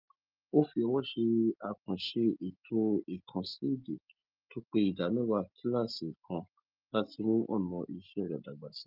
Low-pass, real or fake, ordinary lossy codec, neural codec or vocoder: 5.4 kHz; real; Opus, 24 kbps; none